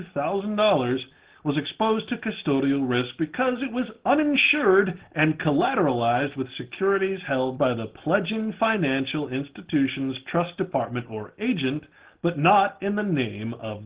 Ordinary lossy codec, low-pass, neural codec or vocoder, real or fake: Opus, 16 kbps; 3.6 kHz; none; real